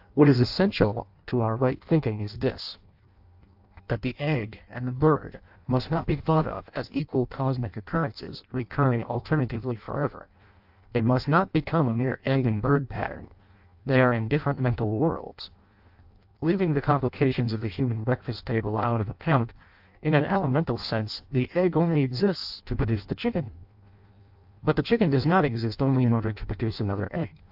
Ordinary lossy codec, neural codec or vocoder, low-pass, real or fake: AAC, 48 kbps; codec, 16 kHz in and 24 kHz out, 0.6 kbps, FireRedTTS-2 codec; 5.4 kHz; fake